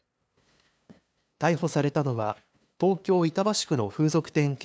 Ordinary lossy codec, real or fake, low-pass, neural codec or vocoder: none; fake; none; codec, 16 kHz, 2 kbps, FunCodec, trained on LibriTTS, 25 frames a second